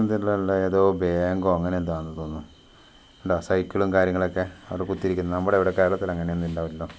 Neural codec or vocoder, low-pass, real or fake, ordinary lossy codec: none; none; real; none